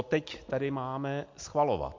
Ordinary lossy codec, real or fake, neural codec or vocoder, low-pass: MP3, 48 kbps; real; none; 7.2 kHz